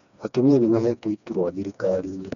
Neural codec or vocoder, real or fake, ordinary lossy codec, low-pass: codec, 16 kHz, 2 kbps, FreqCodec, smaller model; fake; none; 7.2 kHz